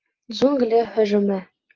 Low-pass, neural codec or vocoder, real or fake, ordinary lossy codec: 7.2 kHz; none; real; Opus, 24 kbps